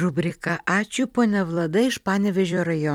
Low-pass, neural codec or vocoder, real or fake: 19.8 kHz; vocoder, 44.1 kHz, 128 mel bands every 256 samples, BigVGAN v2; fake